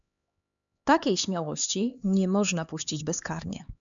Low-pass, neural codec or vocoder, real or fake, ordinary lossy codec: 7.2 kHz; codec, 16 kHz, 4 kbps, X-Codec, HuBERT features, trained on LibriSpeech; fake; MP3, 96 kbps